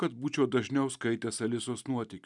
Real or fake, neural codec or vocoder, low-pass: real; none; 10.8 kHz